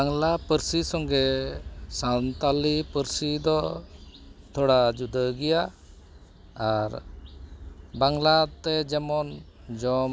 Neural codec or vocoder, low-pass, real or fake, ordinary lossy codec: none; none; real; none